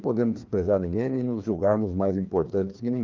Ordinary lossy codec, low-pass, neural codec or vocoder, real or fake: Opus, 32 kbps; 7.2 kHz; codec, 16 kHz, 2 kbps, FreqCodec, larger model; fake